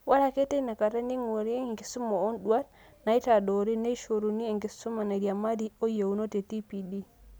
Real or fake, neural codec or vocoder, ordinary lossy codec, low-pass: real; none; none; none